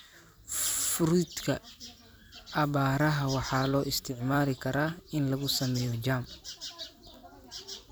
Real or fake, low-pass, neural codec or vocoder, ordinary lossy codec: fake; none; vocoder, 44.1 kHz, 128 mel bands every 256 samples, BigVGAN v2; none